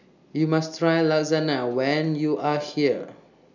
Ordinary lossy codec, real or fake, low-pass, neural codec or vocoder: none; real; 7.2 kHz; none